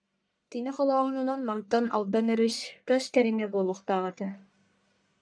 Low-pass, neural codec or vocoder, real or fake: 9.9 kHz; codec, 44.1 kHz, 1.7 kbps, Pupu-Codec; fake